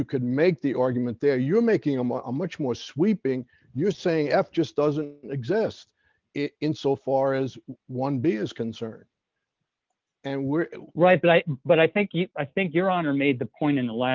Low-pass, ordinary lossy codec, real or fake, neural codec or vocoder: 7.2 kHz; Opus, 32 kbps; real; none